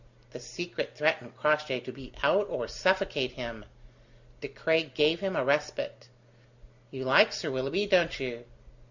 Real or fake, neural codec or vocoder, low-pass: real; none; 7.2 kHz